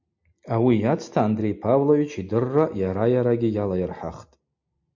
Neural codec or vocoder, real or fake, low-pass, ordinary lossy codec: none; real; 7.2 kHz; MP3, 48 kbps